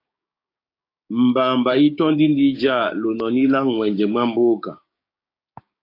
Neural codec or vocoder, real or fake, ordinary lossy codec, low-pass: codec, 16 kHz, 6 kbps, DAC; fake; AAC, 32 kbps; 5.4 kHz